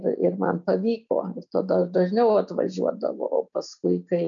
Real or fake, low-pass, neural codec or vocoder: real; 7.2 kHz; none